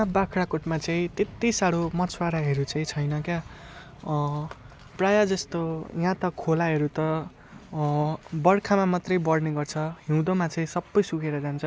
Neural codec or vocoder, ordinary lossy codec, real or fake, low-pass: none; none; real; none